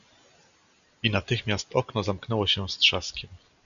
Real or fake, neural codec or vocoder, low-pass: real; none; 7.2 kHz